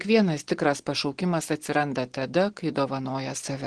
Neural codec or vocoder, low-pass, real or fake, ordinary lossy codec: none; 9.9 kHz; real; Opus, 16 kbps